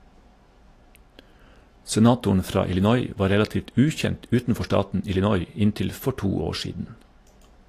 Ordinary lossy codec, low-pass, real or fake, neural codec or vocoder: AAC, 48 kbps; 14.4 kHz; real; none